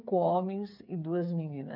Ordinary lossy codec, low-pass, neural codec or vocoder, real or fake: MP3, 48 kbps; 5.4 kHz; codec, 16 kHz, 8 kbps, FreqCodec, smaller model; fake